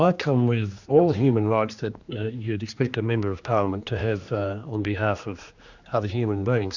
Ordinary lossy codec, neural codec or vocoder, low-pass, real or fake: Opus, 64 kbps; codec, 16 kHz, 2 kbps, X-Codec, HuBERT features, trained on general audio; 7.2 kHz; fake